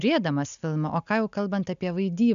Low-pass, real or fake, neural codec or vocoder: 7.2 kHz; real; none